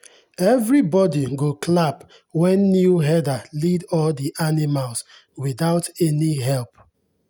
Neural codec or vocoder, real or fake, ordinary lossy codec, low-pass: none; real; none; none